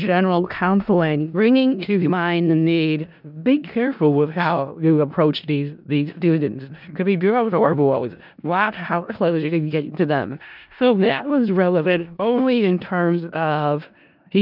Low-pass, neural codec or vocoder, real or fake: 5.4 kHz; codec, 16 kHz in and 24 kHz out, 0.4 kbps, LongCat-Audio-Codec, four codebook decoder; fake